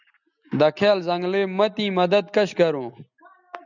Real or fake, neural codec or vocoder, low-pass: real; none; 7.2 kHz